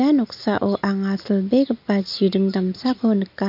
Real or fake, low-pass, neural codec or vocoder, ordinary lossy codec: real; 5.4 kHz; none; MP3, 48 kbps